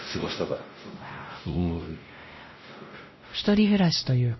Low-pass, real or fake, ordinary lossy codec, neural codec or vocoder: 7.2 kHz; fake; MP3, 24 kbps; codec, 16 kHz, 0.5 kbps, X-Codec, WavLM features, trained on Multilingual LibriSpeech